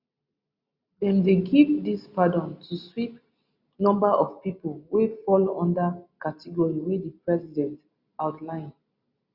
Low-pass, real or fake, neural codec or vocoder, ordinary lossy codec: 5.4 kHz; real; none; Opus, 64 kbps